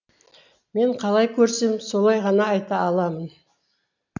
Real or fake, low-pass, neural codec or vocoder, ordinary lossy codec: real; 7.2 kHz; none; none